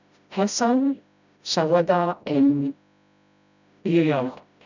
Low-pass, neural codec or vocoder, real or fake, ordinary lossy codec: 7.2 kHz; codec, 16 kHz, 0.5 kbps, FreqCodec, smaller model; fake; none